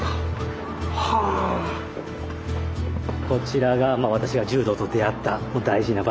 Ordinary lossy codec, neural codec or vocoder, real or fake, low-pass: none; none; real; none